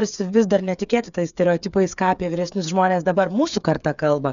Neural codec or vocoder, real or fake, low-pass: codec, 16 kHz, 8 kbps, FreqCodec, smaller model; fake; 7.2 kHz